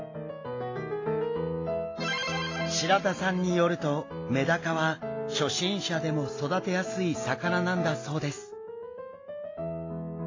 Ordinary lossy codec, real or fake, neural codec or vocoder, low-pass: AAC, 32 kbps; real; none; 7.2 kHz